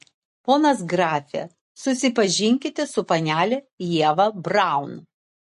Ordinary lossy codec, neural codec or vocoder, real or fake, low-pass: MP3, 48 kbps; none; real; 14.4 kHz